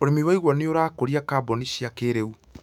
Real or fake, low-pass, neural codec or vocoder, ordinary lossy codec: fake; 19.8 kHz; autoencoder, 48 kHz, 128 numbers a frame, DAC-VAE, trained on Japanese speech; none